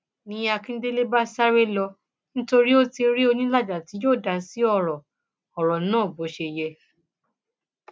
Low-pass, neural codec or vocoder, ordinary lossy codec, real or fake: none; none; none; real